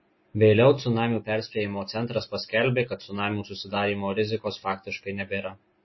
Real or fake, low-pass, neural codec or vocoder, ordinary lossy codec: real; 7.2 kHz; none; MP3, 24 kbps